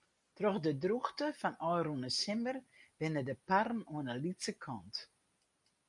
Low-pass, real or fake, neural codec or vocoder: 10.8 kHz; real; none